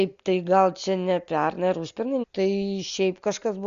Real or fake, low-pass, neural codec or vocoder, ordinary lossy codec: real; 7.2 kHz; none; Opus, 64 kbps